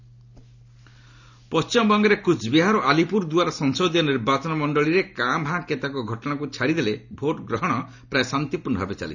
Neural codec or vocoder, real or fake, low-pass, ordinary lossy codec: none; real; 7.2 kHz; none